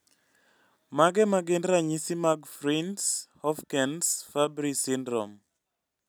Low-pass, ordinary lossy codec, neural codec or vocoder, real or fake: none; none; none; real